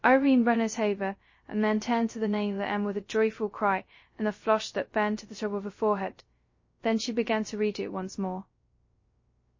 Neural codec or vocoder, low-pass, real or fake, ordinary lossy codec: codec, 16 kHz, 0.2 kbps, FocalCodec; 7.2 kHz; fake; MP3, 32 kbps